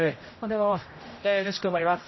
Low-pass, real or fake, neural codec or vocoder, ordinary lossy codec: 7.2 kHz; fake; codec, 16 kHz, 0.5 kbps, X-Codec, HuBERT features, trained on general audio; MP3, 24 kbps